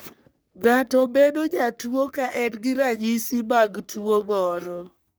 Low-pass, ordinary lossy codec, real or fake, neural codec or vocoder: none; none; fake; codec, 44.1 kHz, 3.4 kbps, Pupu-Codec